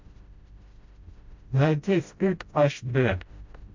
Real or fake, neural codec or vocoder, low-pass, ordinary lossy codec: fake; codec, 16 kHz, 0.5 kbps, FreqCodec, smaller model; 7.2 kHz; MP3, 48 kbps